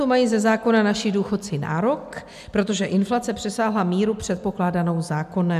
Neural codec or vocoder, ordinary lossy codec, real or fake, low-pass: none; MP3, 96 kbps; real; 14.4 kHz